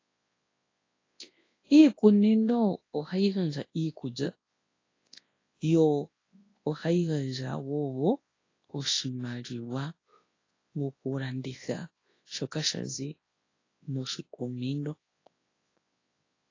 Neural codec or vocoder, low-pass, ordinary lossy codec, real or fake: codec, 24 kHz, 0.9 kbps, WavTokenizer, large speech release; 7.2 kHz; AAC, 32 kbps; fake